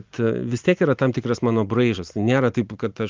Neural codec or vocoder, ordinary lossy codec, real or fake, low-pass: none; Opus, 24 kbps; real; 7.2 kHz